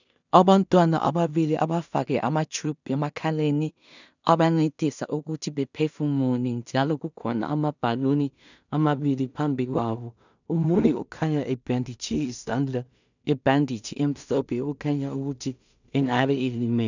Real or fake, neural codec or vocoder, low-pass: fake; codec, 16 kHz in and 24 kHz out, 0.4 kbps, LongCat-Audio-Codec, two codebook decoder; 7.2 kHz